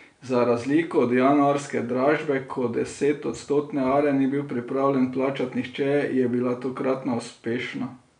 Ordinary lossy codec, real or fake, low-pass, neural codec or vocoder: none; real; 9.9 kHz; none